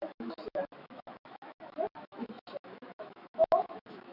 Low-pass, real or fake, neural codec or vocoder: 5.4 kHz; fake; autoencoder, 48 kHz, 128 numbers a frame, DAC-VAE, trained on Japanese speech